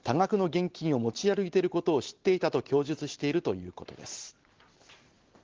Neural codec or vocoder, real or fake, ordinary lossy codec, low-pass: none; real; Opus, 16 kbps; 7.2 kHz